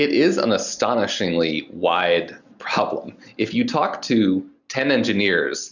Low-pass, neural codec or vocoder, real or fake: 7.2 kHz; none; real